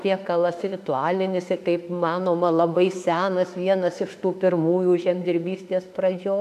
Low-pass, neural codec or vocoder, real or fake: 14.4 kHz; autoencoder, 48 kHz, 32 numbers a frame, DAC-VAE, trained on Japanese speech; fake